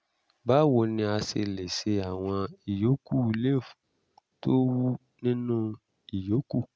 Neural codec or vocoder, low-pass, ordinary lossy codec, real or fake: none; none; none; real